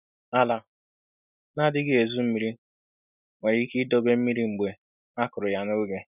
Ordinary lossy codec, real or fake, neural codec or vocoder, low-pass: none; real; none; 3.6 kHz